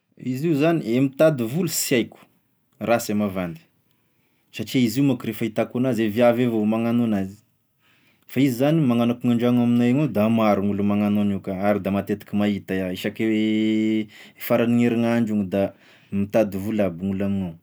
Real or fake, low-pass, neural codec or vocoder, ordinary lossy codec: real; none; none; none